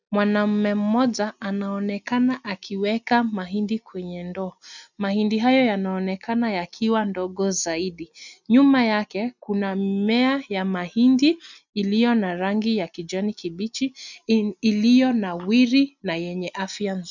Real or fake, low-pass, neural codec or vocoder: real; 7.2 kHz; none